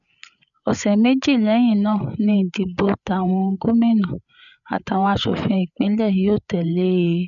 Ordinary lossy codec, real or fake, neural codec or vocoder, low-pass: none; fake; codec, 16 kHz, 8 kbps, FreqCodec, larger model; 7.2 kHz